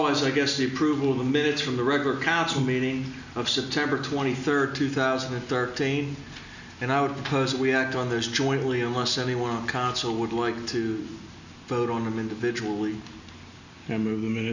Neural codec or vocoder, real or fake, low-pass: none; real; 7.2 kHz